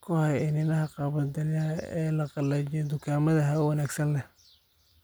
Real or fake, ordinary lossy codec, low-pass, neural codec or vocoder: real; none; none; none